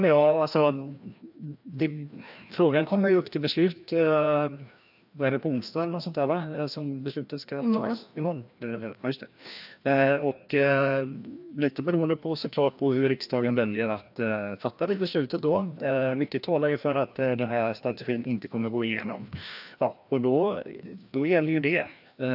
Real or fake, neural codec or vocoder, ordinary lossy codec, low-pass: fake; codec, 16 kHz, 1 kbps, FreqCodec, larger model; AAC, 48 kbps; 5.4 kHz